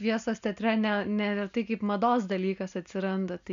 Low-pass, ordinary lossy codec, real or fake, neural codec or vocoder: 7.2 kHz; AAC, 96 kbps; real; none